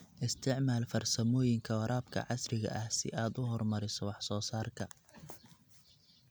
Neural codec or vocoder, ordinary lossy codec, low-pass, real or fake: none; none; none; real